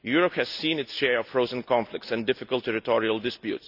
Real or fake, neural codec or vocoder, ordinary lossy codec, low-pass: real; none; none; 5.4 kHz